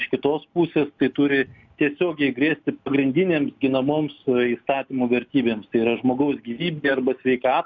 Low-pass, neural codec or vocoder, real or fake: 7.2 kHz; none; real